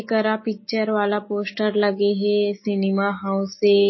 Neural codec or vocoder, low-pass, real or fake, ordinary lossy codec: none; 7.2 kHz; real; MP3, 24 kbps